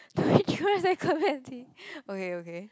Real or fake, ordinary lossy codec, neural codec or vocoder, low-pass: real; none; none; none